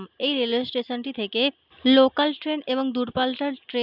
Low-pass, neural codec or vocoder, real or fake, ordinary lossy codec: 5.4 kHz; none; real; none